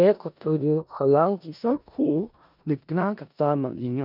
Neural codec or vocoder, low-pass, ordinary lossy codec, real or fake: codec, 16 kHz in and 24 kHz out, 0.4 kbps, LongCat-Audio-Codec, four codebook decoder; 5.4 kHz; none; fake